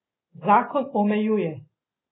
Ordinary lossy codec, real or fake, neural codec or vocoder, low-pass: AAC, 16 kbps; real; none; 7.2 kHz